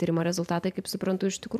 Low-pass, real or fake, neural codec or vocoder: 14.4 kHz; fake; vocoder, 44.1 kHz, 128 mel bands every 512 samples, BigVGAN v2